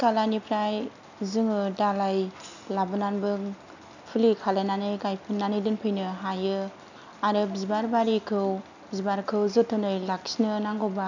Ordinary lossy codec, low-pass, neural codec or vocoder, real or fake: none; 7.2 kHz; none; real